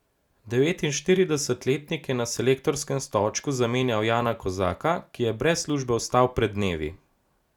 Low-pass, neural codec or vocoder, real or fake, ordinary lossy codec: 19.8 kHz; none; real; none